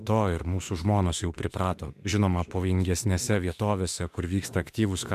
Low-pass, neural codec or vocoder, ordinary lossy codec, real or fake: 14.4 kHz; autoencoder, 48 kHz, 32 numbers a frame, DAC-VAE, trained on Japanese speech; AAC, 96 kbps; fake